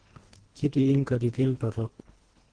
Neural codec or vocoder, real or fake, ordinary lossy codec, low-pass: codec, 24 kHz, 1.5 kbps, HILCodec; fake; Opus, 16 kbps; 9.9 kHz